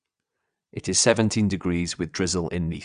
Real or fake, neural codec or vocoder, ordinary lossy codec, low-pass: fake; vocoder, 22.05 kHz, 80 mel bands, WaveNeXt; Opus, 64 kbps; 9.9 kHz